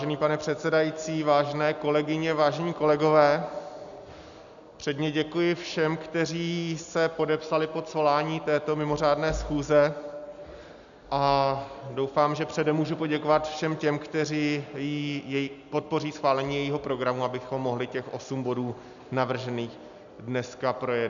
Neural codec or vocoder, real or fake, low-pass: none; real; 7.2 kHz